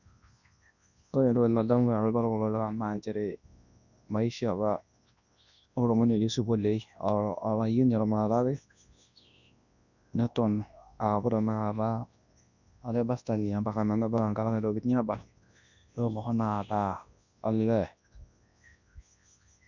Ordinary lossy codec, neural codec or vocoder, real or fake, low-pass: none; codec, 24 kHz, 0.9 kbps, WavTokenizer, large speech release; fake; 7.2 kHz